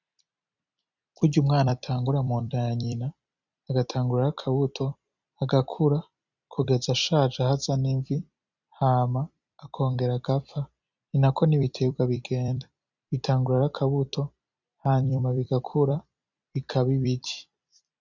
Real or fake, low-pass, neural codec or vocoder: fake; 7.2 kHz; vocoder, 44.1 kHz, 128 mel bands every 256 samples, BigVGAN v2